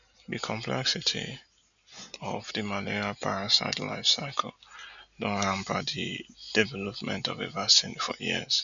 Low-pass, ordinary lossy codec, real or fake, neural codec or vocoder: 7.2 kHz; none; real; none